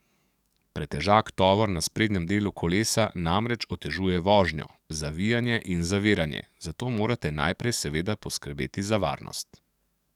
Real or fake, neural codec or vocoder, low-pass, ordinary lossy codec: fake; codec, 44.1 kHz, 7.8 kbps, DAC; 19.8 kHz; none